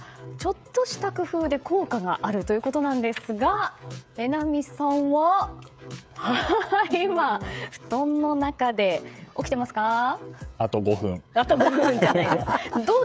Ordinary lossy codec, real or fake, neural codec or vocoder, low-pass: none; fake; codec, 16 kHz, 16 kbps, FreqCodec, smaller model; none